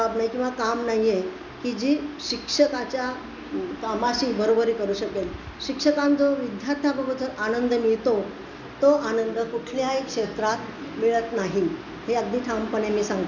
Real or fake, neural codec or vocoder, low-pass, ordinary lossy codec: real; none; 7.2 kHz; none